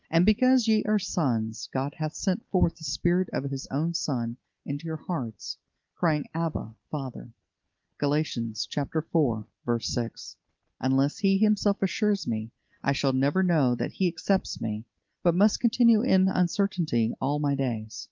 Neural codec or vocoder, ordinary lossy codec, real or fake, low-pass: none; Opus, 24 kbps; real; 7.2 kHz